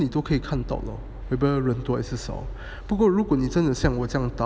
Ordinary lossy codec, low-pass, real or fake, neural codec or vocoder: none; none; real; none